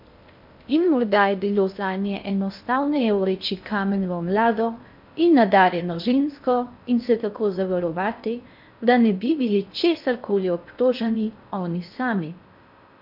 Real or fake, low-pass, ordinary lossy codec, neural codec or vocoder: fake; 5.4 kHz; MP3, 48 kbps; codec, 16 kHz in and 24 kHz out, 0.6 kbps, FocalCodec, streaming, 2048 codes